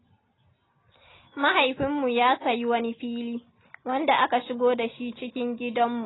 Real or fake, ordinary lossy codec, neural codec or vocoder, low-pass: real; AAC, 16 kbps; none; 7.2 kHz